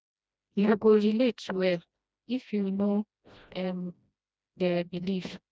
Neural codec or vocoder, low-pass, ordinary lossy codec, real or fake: codec, 16 kHz, 1 kbps, FreqCodec, smaller model; none; none; fake